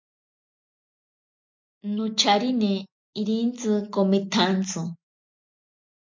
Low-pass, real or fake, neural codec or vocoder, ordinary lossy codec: 7.2 kHz; real; none; MP3, 48 kbps